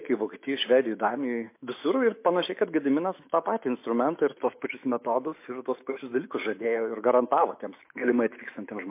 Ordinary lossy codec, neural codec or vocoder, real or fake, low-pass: MP3, 24 kbps; autoencoder, 48 kHz, 128 numbers a frame, DAC-VAE, trained on Japanese speech; fake; 3.6 kHz